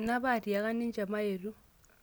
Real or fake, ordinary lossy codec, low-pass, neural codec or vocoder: real; none; none; none